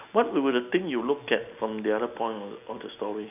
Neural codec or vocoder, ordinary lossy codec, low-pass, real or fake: none; none; 3.6 kHz; real